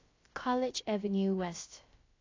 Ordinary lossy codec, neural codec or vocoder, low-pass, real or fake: AAC, 32 kbps; codec, 16 kHz, about 1 kbps, DyCAST, with the encoder's durations; 7.2 kHz; fake